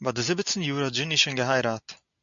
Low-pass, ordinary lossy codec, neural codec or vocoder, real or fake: 7.2 kHz; MP3, 64 kbps; none; real